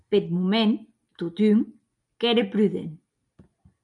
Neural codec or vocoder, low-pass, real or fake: none; 10.8 kHz; real